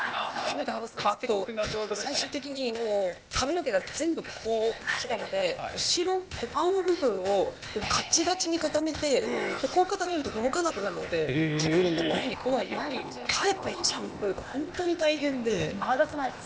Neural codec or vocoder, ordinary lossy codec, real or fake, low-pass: codec, 16 kHz, 0.8 kbps, ZipCodec; none; fake; none